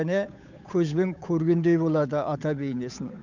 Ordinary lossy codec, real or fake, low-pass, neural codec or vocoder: none; fake; 7.2 kHz; codec, 16 kHz, 8 kbps, FunCodec, trained on Chinese and English, 25 frames a second